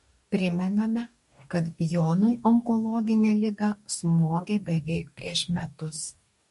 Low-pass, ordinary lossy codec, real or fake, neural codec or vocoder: 14.4 kHz; MP3, 48 kbps; fake; codec, 44.1 kHz, 2.6 kbps, DAC